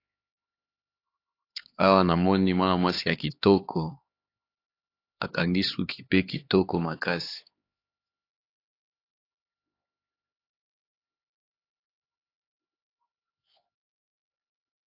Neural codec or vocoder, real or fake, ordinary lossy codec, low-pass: codec, 16 kHz, 4 kbps, X-Codec, HuBERT features, trained on LibriSpeech; fake; AAC, 32 kbps; 5.4 kHz